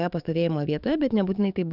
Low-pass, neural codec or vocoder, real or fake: 5.4 kHz; codec, 44.1 kHz, 7.8 kbps, DAC; fake